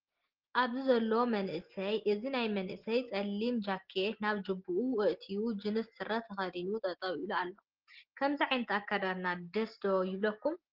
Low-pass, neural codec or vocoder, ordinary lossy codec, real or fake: 5.4 kHz; none; Opus, 16 kbps; real